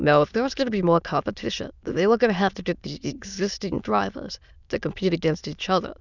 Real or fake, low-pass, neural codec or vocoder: fake; 7.2 kHz; autoencoder, 22.05 kHz, a latent of 192 numbers a frame, VITS, trained on many speakers